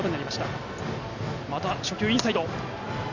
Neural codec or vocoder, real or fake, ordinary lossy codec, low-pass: none; real; none; 7.2 kHz